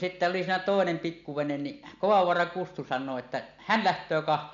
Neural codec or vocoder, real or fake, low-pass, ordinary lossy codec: none; real; 7.2 kHz; MP3, 96 kbps